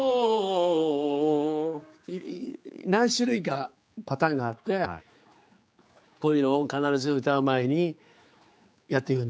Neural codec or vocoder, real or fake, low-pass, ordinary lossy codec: codec, 16 kHz, 4 kbps, X-Codec, HuBERT features, trained on general audio; fake; none; none